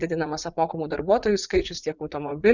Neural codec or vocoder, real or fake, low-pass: vocoder, 44.1 kHz, 80 mel bands, Vocos; fake; 7.2 kHz